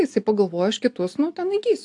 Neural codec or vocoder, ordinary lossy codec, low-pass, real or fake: none; AAC, 64 kbps; 10.8 kHz; real